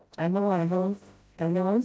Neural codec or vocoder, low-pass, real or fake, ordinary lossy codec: codec, 16 kHz, 0.5 kbps, FreqCodec, smaller model; none; fake; none